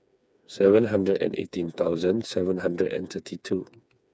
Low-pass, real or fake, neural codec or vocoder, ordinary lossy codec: none; fake; codec, 16 kHz, 4 kbps, FreqCodec, smaller model; none